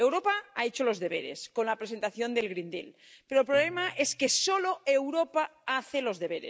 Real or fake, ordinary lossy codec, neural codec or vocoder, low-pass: real; none; none; none